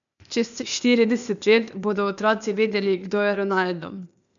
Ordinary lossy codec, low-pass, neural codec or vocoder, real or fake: none; 7.2 kHz; codec, 16 kHz, 0.8 kbps, ZipCodec; fake